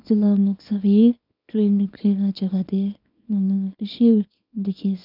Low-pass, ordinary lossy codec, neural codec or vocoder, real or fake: 5.4 kHz; none; codec, 24 kHz, 0.9 kbps, WavTokenizer, small release; fake